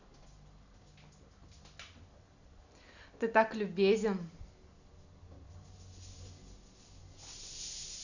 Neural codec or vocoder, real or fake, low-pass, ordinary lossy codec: none; real; 7.2 kHz; none